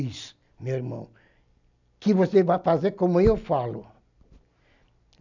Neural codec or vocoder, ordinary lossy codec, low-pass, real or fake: none; none; 7.2 kHz; real